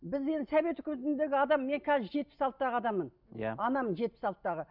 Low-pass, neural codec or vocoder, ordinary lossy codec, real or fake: 5.4 kHz; none; none; real